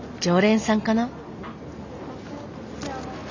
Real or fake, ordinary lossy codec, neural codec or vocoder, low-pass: real; none; none; 7.2 kHz